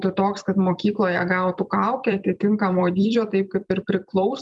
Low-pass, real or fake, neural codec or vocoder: 10.8 kHz; fake; vocoder, 44.1 kHz, 128 mel bands every 256 samples, BigVGAN v2